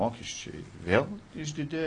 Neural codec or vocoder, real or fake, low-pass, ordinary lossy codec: none; real; 9.9 kHz; AAC, 32 kbps